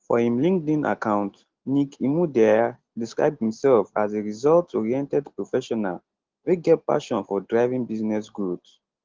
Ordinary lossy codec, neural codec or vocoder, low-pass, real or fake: Opus, 16 kbps; none; 7.2 kHz; real